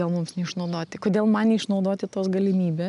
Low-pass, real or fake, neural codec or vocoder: 10.8 kHz; real; none